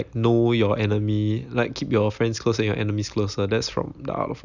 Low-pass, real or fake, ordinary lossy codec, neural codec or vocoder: 7.2 kHz; real; none; none